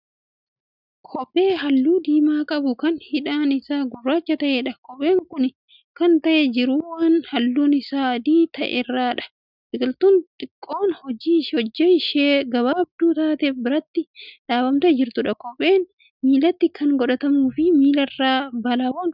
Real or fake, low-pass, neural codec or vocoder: real; 5.4 kHz; none